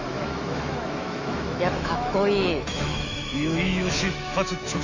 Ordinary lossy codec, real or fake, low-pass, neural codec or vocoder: AAC, 48 kbps; fake; 7.2 kHz; autoencoder, 48 kHz, 128 numbers a frame, DAC-VAE, trained on Japanese speech